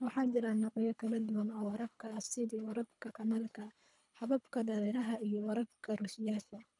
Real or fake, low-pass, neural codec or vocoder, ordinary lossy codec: fake; 10.8 kHz; codec, 24 kHz, 3 kbps, HILCodec; none